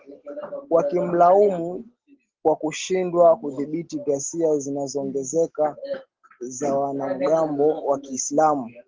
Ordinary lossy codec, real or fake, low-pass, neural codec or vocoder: Opus, 16 kbps; real; 7.2 kHz; none